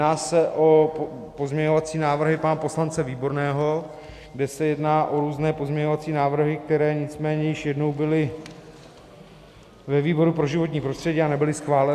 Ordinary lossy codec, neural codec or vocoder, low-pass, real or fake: AAC, 96 kbps; none; 14.4 kHz; real